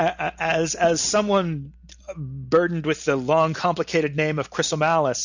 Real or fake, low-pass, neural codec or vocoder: real; 7.2 kHz; none